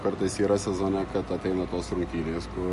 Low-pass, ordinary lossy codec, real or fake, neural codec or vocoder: 14.4 kHz; MP3, 48 kbps; real; none